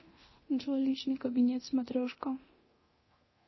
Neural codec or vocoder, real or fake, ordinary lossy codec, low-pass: codec, 24 kHz, 0.9 kbps, DualCodec; fake; MP3, 24 kbps; 7.2 kHz